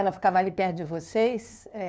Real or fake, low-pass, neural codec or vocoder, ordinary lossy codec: fake; none; codec, 16 kHz, 2 kbps, FunCodec, trained on LibriTTS, 25 frames a second; none